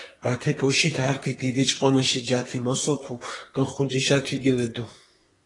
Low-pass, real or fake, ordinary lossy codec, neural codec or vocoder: 10.8 kHz; fake; AAC, 32 kbps; codec, 24 kHz, 1 kbps, SNAC